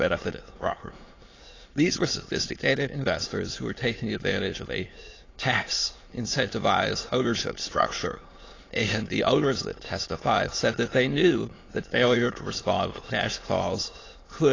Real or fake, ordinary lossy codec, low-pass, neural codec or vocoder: fake; AAC, 32 kbps; 7.2 kHz; autoencoder, 22.05 kHz, a latent of 192 numbers a frame, VITS, trained on many speakers